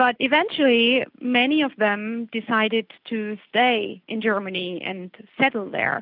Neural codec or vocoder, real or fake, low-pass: none; real; 5.4 kHz